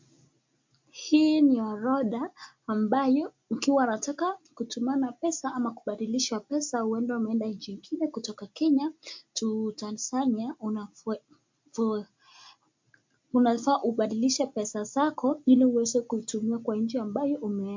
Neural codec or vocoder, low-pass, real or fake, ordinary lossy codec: none; 7.2 kHz; real; MP3, 48 kbps